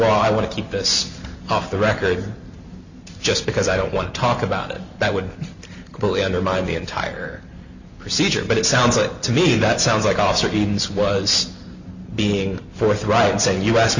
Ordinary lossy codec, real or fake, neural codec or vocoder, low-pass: Opus, 64 kbps; real; none; 7.2 kHz